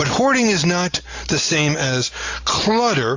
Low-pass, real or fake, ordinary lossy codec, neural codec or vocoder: 7.2 kHz; real; AAC, 48 kbps; none